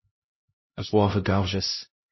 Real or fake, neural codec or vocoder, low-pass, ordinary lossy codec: fake; codec, 16 kHz, 0.5 kbps, X-Codec, HuBERT features, trained on LibriSpeech; 7.2 kHz; MP3, 24 kbps